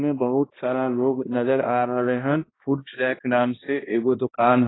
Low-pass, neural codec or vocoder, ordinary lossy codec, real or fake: 7.2 kHz; codec, 16 kHz, 1 kbps, X-Codec, HuBERT features, trained on balanced general audio; AAC, 16 kbps; fake